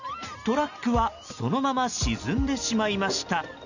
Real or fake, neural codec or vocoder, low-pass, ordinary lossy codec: real; none; 7.2 kHz; none